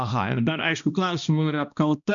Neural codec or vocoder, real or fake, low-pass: codec, 16 kHz, 1 kbps, X-Codec, HuBERT features, trained on balanced general audio; fake; 7.2 kHz